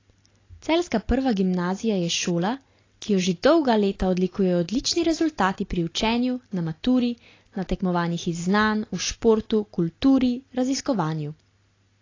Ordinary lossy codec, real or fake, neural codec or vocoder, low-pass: AAC, 32 kbps; real; none; 7.2 kHz